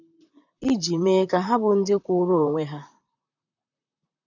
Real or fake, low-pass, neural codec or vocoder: fake; 7.2 kHz; vocoder, 44.1 kHz, 128 mel bands, Pupu-Vocoder